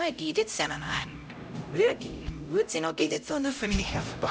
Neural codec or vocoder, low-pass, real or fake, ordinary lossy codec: codec, 16 kHz, 0.5 kbps, X-Codec, HuBERT features, trained on LibriSpeech; none; fake; none